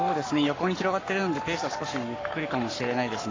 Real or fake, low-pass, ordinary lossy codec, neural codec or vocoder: fake; 7.2 kHz; AAC, 32 kbps; codec, 44.1 kHz, 7.8 kbps, Pupu-Codec